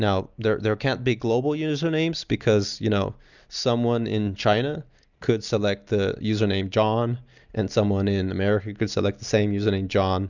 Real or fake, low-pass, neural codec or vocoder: real; 7.2 kHz; none